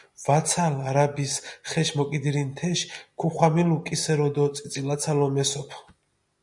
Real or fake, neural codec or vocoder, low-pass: real; none; 10.8 kHz